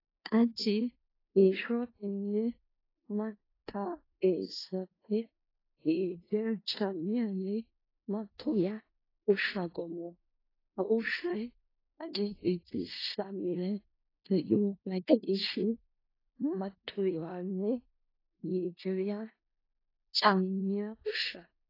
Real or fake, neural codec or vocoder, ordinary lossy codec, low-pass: fake; codec, 16 kHz in and 24 kHz out, 0.4 kbps, LongCat-Audio-Codec, four codebook decoder; AAC, 24 kbps; 5.4 kHz